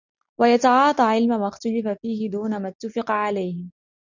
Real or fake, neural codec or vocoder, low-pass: real; none; 7.2 kHz